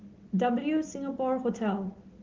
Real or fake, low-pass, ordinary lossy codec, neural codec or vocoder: real; 7.2 kHz; Opus, 16 kbps; none